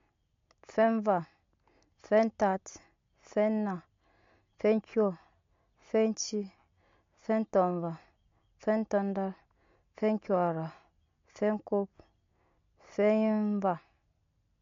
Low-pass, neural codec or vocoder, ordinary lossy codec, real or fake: 7.2 kHz; none; MP3, 48 kbps; real